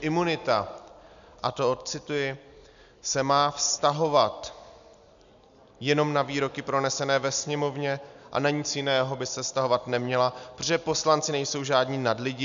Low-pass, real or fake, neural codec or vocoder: 7.2 kHz; real; none